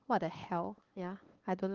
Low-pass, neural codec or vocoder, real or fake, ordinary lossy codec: 7.2 kHz; codec, 16 kHz, 8 kbps, FunCodec, trained on LibriTTS, 25 frames a second; fake; Opus, 16 kbps